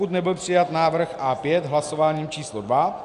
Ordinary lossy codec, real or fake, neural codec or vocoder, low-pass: MP3, 96 kbps; real; none; 10.8 kHz